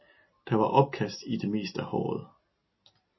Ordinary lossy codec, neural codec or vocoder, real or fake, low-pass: MP3, 24 kbps; none; real; 7.2 kHz